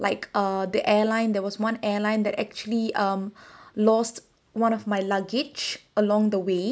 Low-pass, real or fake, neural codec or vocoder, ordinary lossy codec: none; real; none; none